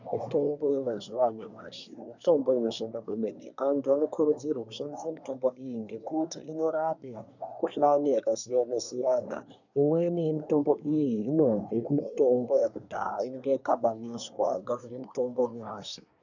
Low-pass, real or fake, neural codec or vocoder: 7.2 kHz; fake; codec, 24 kHz, 1 kbps, SNAC